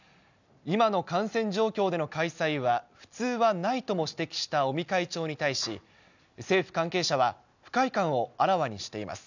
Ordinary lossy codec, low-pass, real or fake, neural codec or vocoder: none; 7.2 kHz; real; none